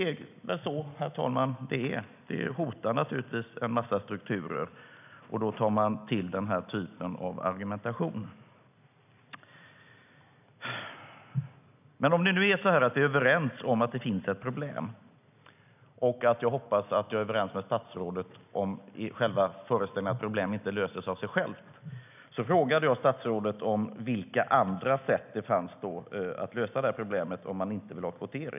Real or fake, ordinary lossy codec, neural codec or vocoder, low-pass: real; none; none; 3.6 kHz